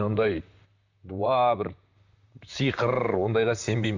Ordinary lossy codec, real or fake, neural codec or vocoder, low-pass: Opus, 64 kbps; fake; vocoder, 44.1 kHz, 128 mel bands, Pupu-Vocoder; 7.2 kHz